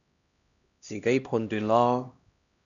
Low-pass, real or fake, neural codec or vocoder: 7.2 kHz; fake; codec, 16 kHz, 1 kbps, X-Codec, HuBERT features, trained on LibriSpeech